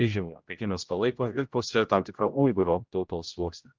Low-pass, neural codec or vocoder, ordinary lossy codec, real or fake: 7.2 kHz; codec, 16 kHz, 0.5 kbps, X-Codec, HuBERT features, trained on general audio; Opus, 32 kbps; fake